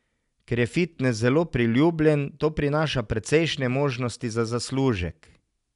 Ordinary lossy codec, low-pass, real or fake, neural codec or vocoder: none; 10.8 kHz; real; none